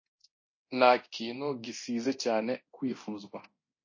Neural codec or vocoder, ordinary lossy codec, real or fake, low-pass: codec, 24 kHz, 0.9 kbps, DualCodec; MP3, 32 kbps; fake; 7.2 kHz